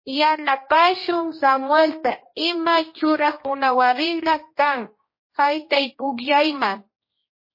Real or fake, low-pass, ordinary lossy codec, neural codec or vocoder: fake; 5.4 kHz; MP3, 24 kbps; codec, 16 kHz, 1 kbps, X-Codec, HuBERT features, trained on general audio